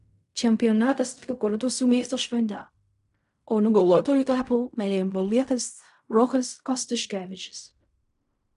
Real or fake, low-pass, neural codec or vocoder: fake; 10.8 kHz; codec, 16 kHz in and 24 kHz out, 0.4 kbps, LongCat-Audio-Codec, fine tuned four codebook decoder